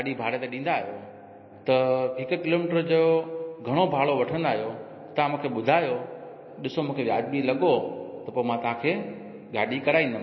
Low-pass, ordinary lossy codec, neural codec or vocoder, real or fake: 7.2 kHz; MP3, 24 kbps; none; real